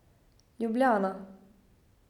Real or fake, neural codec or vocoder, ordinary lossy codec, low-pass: real; none; none; 19.8 kHz